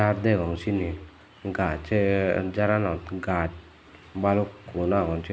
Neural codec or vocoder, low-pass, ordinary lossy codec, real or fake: none; none; none; real